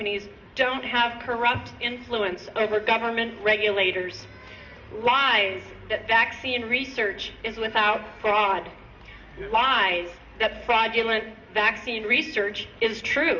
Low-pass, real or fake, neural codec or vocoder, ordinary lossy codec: 7.2 kHz; real; none; Opus, 64 kbps